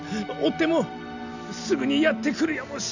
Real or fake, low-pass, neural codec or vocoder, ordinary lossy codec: real; 7.2 kHz; none; none